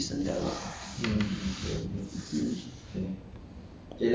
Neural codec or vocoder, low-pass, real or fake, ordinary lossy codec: none; none; real; none